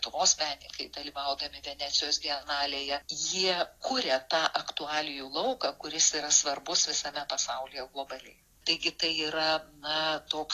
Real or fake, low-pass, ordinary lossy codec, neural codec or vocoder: real; 14.4 kHz; AAC, 48 kbps; none